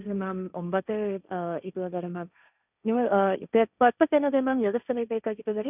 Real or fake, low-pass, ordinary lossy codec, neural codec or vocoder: fake; 3.6 kHz; none; codec, 16 kHz, 1.1 kbps, Voila-Tokenizer